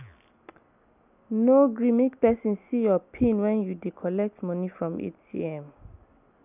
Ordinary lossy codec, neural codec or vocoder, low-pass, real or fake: none; none; 3.6 kHz; real